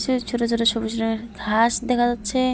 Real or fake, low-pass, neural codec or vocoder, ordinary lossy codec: real; none; none; none